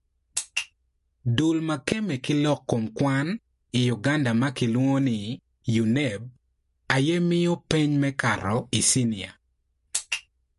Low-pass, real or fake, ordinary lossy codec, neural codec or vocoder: 14.4 kHz; real; MP3, 48 kbps; none